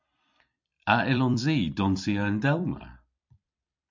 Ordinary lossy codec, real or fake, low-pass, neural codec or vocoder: MP3, 64 kbps; fake; 7.2 kHz; vocoder, 44.1 kHz, 128 mel bands every 256 samples, BigVGAN v2